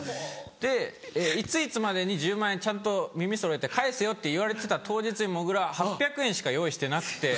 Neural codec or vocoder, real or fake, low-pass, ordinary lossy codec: none; real; none; none